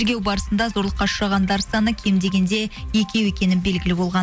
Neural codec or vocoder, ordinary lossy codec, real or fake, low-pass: none; none; real; none